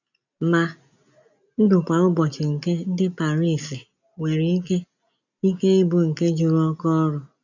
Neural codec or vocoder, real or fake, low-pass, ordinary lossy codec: none; real; 7.2 kHz; none